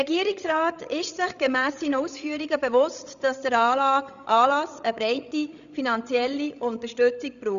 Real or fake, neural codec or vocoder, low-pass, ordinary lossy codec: fake; codec, 16 kHz, 16 kbps, FreqCodec, larger model; 7.2 kHz; none